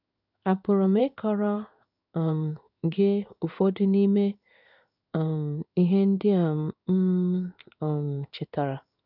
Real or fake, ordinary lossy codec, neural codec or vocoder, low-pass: fake; none; codec, 16 kHz in and 24 kHz out, 1 kbps, XY-Tokenizer; 5.4 kHz